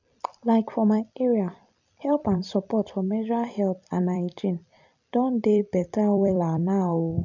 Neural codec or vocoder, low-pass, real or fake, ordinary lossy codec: vocoder, 44.1 kHz, 128 mel bands every 256 samples, BigVGAN v2; 7.2 kHz; fake; none